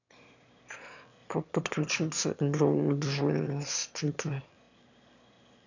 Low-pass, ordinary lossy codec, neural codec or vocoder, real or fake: 7.2 kHz; none; autoencoder, 22.05 kHz, a latent of 192 numbers a frame, VITS, trained on one speaker; fake